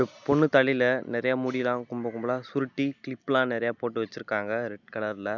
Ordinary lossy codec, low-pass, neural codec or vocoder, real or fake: none; 7.2 kHz; none; real